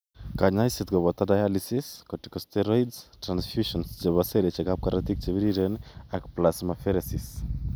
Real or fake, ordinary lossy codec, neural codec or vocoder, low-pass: real; none; none; none